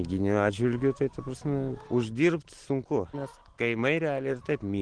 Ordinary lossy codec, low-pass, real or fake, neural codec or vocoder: Opus, 16 kbps; 9.9 kHz; real; none